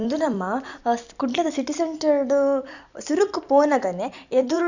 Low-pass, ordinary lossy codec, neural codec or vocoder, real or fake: 7.2 kHz; none; vocoder, 44.1 kHz, 128 mel bands every 256 samples, BigVGAN v2; fake